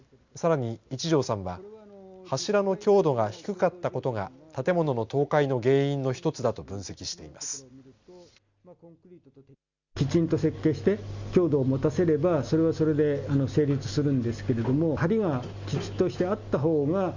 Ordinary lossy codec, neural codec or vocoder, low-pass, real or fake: Opus, 64 kbps; none; 7.2 kHz; real